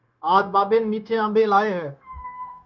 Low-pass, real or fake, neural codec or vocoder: 7.2 kHz; fake; codec, 16 kHz, 0.9 kbps, LongCat-Audio-Codec